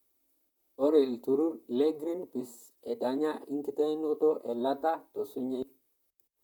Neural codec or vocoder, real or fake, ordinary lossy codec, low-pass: vocoder, 44.1 kHz, 128 mel bands, Pupu-Vocoder; fake; none; none